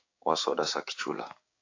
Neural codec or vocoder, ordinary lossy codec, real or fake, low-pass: codec, 16 kHz, 6 kbps, DAC; AAC, 48 kbps; fake; 7.2 kHz